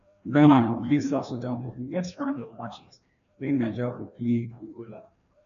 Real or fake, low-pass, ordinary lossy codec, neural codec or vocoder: fake; 7.2 kHz; none; codec, 16 kHz, 1 kbps, FreqCodec, larger model